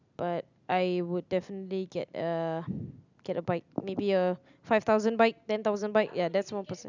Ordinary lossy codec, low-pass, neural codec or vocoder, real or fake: none; 7.2 kHz; none; real